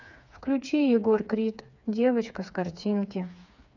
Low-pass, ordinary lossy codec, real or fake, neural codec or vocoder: 7.2 kHz; none; fake; codec, 16 kHz, 4 kbps, FreqCodec, smaller model